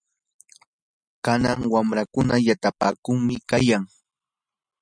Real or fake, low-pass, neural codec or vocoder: real; 9.9 kHz; none